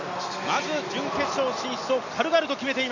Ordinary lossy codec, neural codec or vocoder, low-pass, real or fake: none; none; 7.2 kHz; real